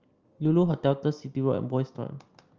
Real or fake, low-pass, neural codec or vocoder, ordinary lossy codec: real; 7.2 kHz; none; Opus, 24 kbps